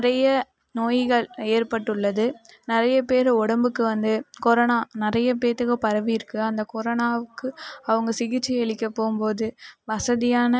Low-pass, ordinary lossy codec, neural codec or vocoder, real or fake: none; none; none; real